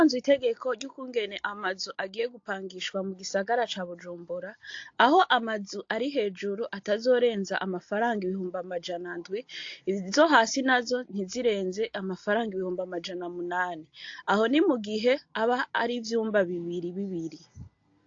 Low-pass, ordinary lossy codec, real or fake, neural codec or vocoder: 7.2 kHz; AAC, 48 kbps; real; none